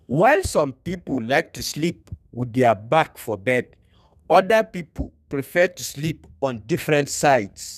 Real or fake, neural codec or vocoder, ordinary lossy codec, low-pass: fake; codec, 32 kHz, 1.9 kbps, SNAC; none; 14.4 kHz